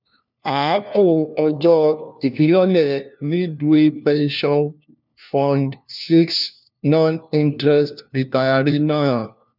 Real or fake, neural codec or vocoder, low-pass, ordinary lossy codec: fake; codec, 16 kHz, 1 kbps, FunCodec, trained on LibriTTS, 50 frames a second; 5.4 kHz; none